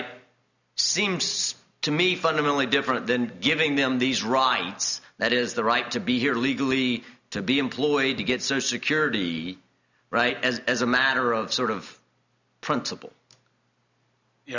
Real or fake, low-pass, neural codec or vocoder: real; 7.2 kHz; none